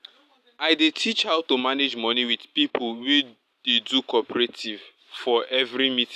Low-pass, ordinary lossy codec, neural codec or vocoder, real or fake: 14.4 kHz; none; none; real